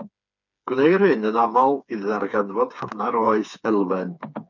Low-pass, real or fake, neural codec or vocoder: 7.2 kHz; fake; codec, 16 kHz, 4 kbps, FreqCodec, smaller model